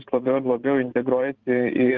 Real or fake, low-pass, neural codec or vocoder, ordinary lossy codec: fake; 7.2 kHz; vocoder, 44.1 kHz, 128 mel bands every 512 samples, BigVGAN v2; Opus, 32 kbps